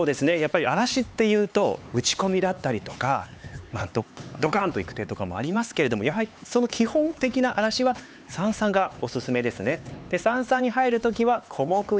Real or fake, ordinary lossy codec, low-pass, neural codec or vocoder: fake; none; none; codec, 16 kHz, 4 kbps, X-Codec, HuBERT features, trained on LibriSpeech